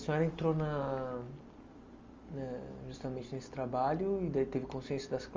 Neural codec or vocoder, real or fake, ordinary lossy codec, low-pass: none; real; Opus, 32 kbps; 7.2 kHz